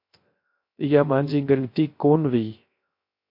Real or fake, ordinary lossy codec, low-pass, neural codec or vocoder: fake; MP3, 32 kbps; 5.4 kHz; codec, 16 kHz, 0.3 kbps, FocalCodec